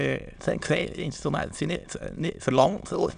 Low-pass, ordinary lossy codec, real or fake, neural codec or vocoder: 9.9 kHz; none; fake; autoencoder, 22.05 kHz, a latent of 192 numbers a frame, VITS, trained on many speakers